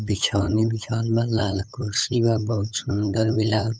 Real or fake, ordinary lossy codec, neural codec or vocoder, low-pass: fake; none; codec, 16 kHz, 8 kbps, FunCodec, trained on LibriTTS, 25 frames a second; none